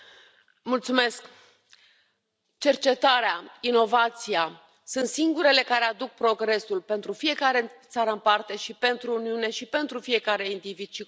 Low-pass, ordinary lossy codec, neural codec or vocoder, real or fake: none; none; none; real